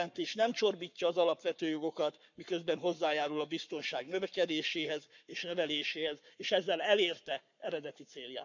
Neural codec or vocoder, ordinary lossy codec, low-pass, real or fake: codec, 44.1 kHz, 7.8 kbps, Pupu-Codec; none; 7.2 kHz; fake